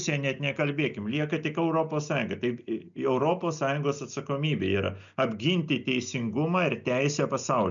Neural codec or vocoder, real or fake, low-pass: none; real; 7.2 kHz